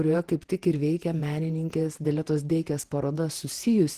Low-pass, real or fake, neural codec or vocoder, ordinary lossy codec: 14.4 kHz; fake; vocoder, 48 kHz, 128 mel bands, Vocos; Opus, 16 kbps